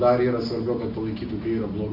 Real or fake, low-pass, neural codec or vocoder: real; 5.4 kHz; none